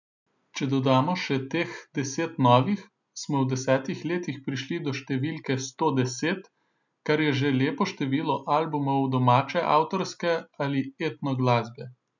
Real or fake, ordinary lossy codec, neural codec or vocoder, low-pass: real; none; none; 7.2 kHz